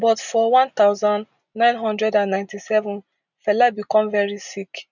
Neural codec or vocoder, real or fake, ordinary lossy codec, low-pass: none; real; none; 7.2 kHz